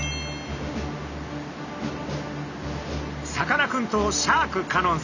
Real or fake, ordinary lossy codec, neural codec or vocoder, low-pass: real; none; none; 7.2 kHz